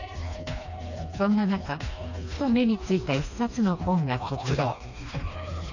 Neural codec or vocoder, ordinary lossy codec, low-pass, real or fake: codec, 16 kHz, 2 kbps, FreqCodec, smaller model; none; 7.2 kHz; fake